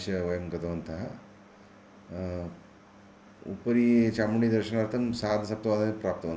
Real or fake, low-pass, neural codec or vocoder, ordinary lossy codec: real; none; none; none